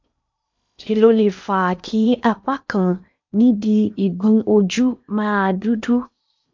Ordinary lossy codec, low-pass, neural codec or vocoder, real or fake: MP3, 64 kbps; 7.2 kHz; codec, 16 kHz in and 24 kHz out, 0.8 kbps, FocalCodec, streaming, 65536 codes; fake